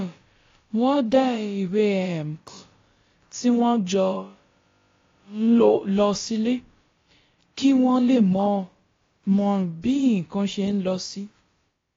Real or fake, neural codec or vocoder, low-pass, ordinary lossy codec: fake; codec, 16 kHz, about 1 kbps, DyCAST, with the encoder's durations; 7.2 kHz; AAC, 32 kbps